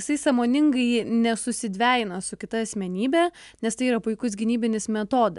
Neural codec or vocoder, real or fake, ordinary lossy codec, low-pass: none; real; MP3, 96 kbps; 10.8 kHz